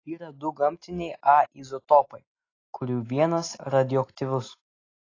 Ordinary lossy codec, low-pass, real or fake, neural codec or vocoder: AAC, 32 kbps; 7.2 kHz; real; none